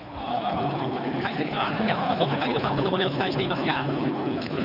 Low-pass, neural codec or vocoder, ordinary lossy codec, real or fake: 5.4 kHz; codec, 24 kHz, 3 kbps, HILCodec; none; fake